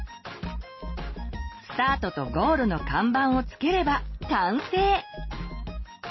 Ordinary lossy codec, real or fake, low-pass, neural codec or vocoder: MP3, 24 kbps; real; 7.2 kHz; none